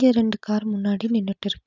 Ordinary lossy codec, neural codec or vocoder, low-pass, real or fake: none; none; 7.2 kHz; real